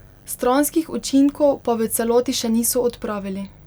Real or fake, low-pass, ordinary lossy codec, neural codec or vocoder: real; none; none; none